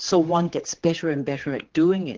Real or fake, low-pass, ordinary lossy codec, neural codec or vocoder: fake; 7.2 kHz; Opus, 16 kbps; codec, 16 kHz, 4 kbps, X-Codec, HuBERT features, trained on general audio